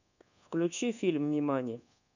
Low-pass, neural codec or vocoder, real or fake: 7.2 kHz; codec, 24 kHz, 1.2 kbps, DualCodec; fake